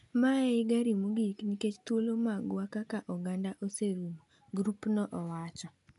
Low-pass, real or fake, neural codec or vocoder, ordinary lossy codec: 10.8 kHz; real; none; none